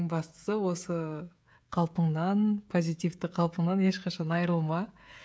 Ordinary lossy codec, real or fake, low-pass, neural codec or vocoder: none; fake; none; codec, 16 kHz, 16 kbps, FreqCodec, smaller model